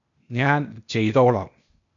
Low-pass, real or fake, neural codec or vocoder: 7.2 kHz; fake; codec, 16 kHz, 0.8 kbps, ZipCodec